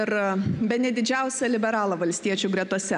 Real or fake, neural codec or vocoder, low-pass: real; none; 10.8 kHz